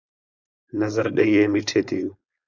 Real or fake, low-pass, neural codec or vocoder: fake; 7.2 kHz; codec, 16 kHz, 4.8 kbps, FACodec